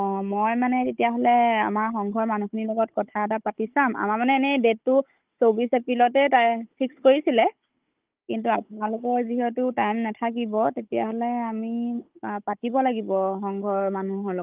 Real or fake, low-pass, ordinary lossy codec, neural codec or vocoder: fake; 3.6 kHz; Opus, 32 kbps; codec, 16 kHz, 16 kbps, FunCodec, trained on Chinese and English, 50 frames a second